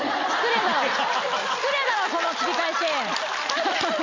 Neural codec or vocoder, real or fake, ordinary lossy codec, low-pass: none; real; MP3, 48 kbps; 7.2 kHz